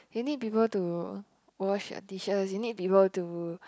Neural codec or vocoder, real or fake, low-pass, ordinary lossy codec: none; real; none; none